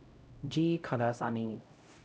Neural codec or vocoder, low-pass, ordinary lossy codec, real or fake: codec, 16 kHz, 0.5 kbps, X-Codec, HuBERT features, trained on LibriSpeech; none; none; fake